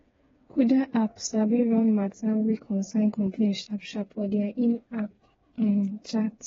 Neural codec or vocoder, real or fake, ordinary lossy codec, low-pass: codec, 16 kHz, 4 kbps, FreqCodec, smaller model; fake; AAC, 24 kbps; 7.2 kHz